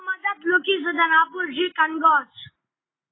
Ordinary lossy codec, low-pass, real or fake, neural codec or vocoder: AAC, 16 kbps; 7.2 kHz; real; none